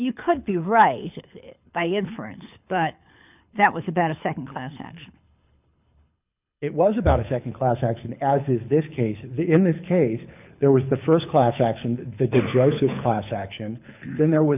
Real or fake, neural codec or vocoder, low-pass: fake; codec, 24 kHz, 6 kbps, HILCodec; 3.6 kHz